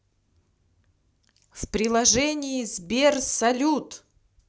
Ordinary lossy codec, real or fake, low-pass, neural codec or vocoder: none; real; none; none